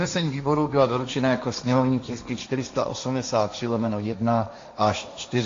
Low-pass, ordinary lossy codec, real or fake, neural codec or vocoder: 7.2 kHz; AAC, 48 kbps; fake; codec, 16 kHz, 1.1 kbps, Voila-Tokenizer